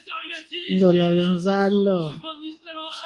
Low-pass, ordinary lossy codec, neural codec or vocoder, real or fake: 10.8 kHz; Opus, 24 kbps; codec, 24 kHz, 1.2 kbps, DualCodec; fake